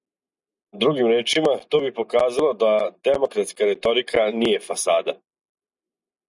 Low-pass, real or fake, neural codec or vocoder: 10.8 kHz; real; none